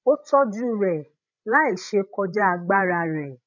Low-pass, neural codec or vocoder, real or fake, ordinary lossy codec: 7.2 kHz; codec, 16 kHz, 16 kbps, FreqCodec, larger model; fake; none